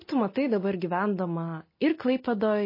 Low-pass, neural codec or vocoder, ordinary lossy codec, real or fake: 5.4 kHz; none; MP3, 24 kbps; real